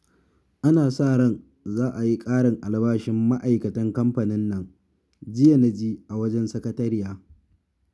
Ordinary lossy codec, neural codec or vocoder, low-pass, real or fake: none; none; none; real